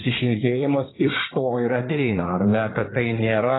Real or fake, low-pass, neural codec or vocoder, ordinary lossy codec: fake; 7.2 kHz; codec, 24 kHz, 1 kbps, SNAC; AAC, 16 kbps